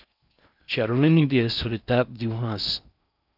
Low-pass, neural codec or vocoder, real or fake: 5.4 kHz; codec, 16 kHz in and 24 kHz out, 0.6 kbps, FocalCodec, streaming, 4096 codes; fake